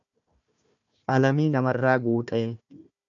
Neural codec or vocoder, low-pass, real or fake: codec, 16 kHz, 1 kbps, FunCodec, trained on Chinese and English, 50 frames a second; 7.2 kHz; fake